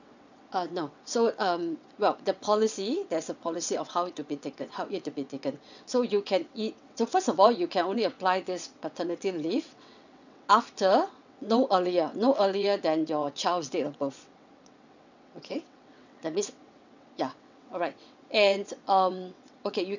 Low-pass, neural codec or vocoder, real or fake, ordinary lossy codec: 7.2 kHz; vocoder, 22.05 kHz, 80 mel bands, WaveNeXt; fake; none